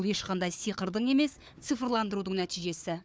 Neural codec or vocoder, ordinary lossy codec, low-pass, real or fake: codec, 16 kHz, 4 kbps, FunCodec, trained on Chinese and English, 50 frames a second; none; none; fake